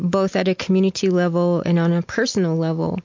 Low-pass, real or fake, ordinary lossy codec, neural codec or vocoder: 7.2 kHz; real; MP3, 48 kbps; none